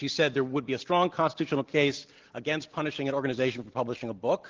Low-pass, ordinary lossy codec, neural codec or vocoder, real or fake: 7.2 kHz; Opus, 16 kbps; none; real